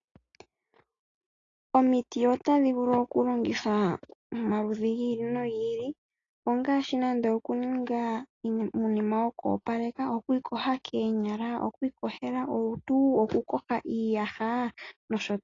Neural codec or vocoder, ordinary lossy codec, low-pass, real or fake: none; AAC, 32 kbps; 7.2 kHz; real